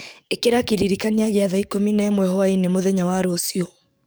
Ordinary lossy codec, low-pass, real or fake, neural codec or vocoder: none; none; fake; codec, 44.1 kHz, 7.8 kbps, DAC